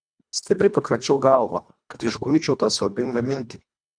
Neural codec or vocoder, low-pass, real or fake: codec, 24 kHz, 1.5 kbps, HILCodec; 9.9 kHz; fake